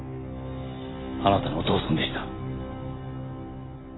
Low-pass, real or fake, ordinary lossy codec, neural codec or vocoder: 7.2 kHz; real; AAC, 16 kbps; none